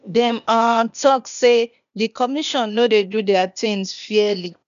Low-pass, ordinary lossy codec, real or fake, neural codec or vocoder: 7.2 kHz; none; fake; codec, 16 kHz, 0.8 kbps, ZipCodec